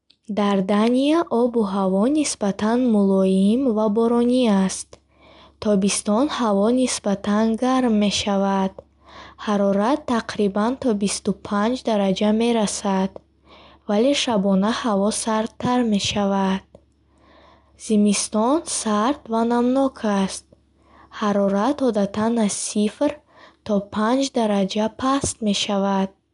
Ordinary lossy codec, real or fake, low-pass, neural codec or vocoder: MP3, 96 kbps; real; 10.8 kHz; none